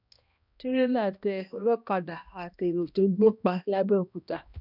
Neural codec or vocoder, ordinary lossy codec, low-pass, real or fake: codec, 16 kHz, 1 kbps, X-Codec, HuBERT features, trained on balanced general audio; none; 5.4 kHz; fake